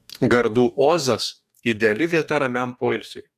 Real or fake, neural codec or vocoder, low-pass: fake; codec, 44.1 kHz, 2.6 kbps, DAC; 14.4 kHz